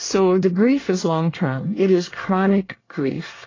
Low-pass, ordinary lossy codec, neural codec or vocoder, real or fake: 7.2 kHz; AAC, 32 kbps; codec, 24 kHz, 1 kbps, SNAC; fake